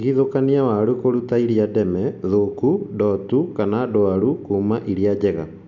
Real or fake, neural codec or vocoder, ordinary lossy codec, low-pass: real; none; none; 7.2 kHz